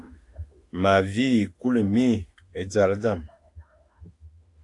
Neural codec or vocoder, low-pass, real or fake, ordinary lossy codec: autoencoder, 48 kHz, 32 numbers a frame, DAC-VAE, trained on Japanese speech; 10.8 kHz; fake; AAC, 48 kbps